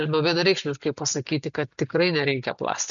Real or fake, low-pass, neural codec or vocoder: real; 7.2 kHz; none